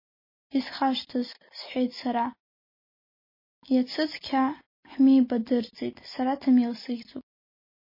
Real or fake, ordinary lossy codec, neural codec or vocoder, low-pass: real; MP3, 24 kbps; none; 5.4 kHz